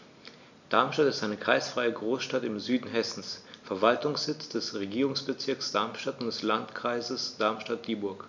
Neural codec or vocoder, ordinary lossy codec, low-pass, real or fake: none; none; 7.2 kHz; real